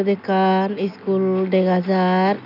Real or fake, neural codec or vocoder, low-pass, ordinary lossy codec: real; none; 5.4 kHz; none